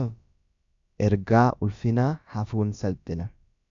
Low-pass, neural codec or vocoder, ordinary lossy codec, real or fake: 7.2 kHz; codec, 16 kHz, about 1 kbps, DyCAST, with the encoder's durations; MP3, 64 kbps; fake